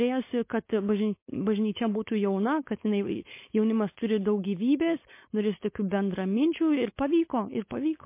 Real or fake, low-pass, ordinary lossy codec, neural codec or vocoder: fake; 3.6 kHz; MP3, 24 kbps; codec, 16 kHz, 4.8 kbps, FACodec